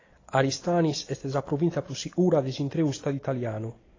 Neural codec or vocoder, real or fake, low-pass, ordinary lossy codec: none; real; 7.2 kHz; AAC, 32 kbps